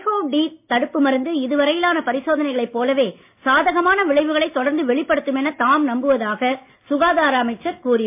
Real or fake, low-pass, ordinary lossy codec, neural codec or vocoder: real; 3.6 kHz; MP3, 32 kbps; none